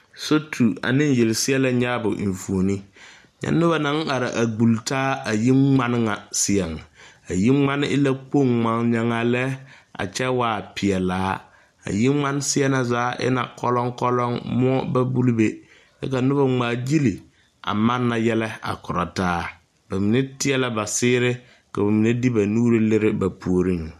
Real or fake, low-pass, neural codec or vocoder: real; 14.4 kHz; none